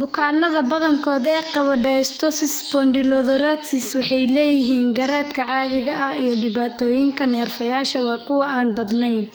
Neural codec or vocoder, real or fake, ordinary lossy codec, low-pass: codec, 44.1 kHz, 2.6 kbps, SNAC; fake; none; none